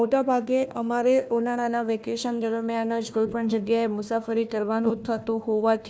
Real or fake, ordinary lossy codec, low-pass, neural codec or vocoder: fake; none; none; codec, 16 kHz, 1 kbps, FunCodec, trained on Chinese and English, 50 frames a second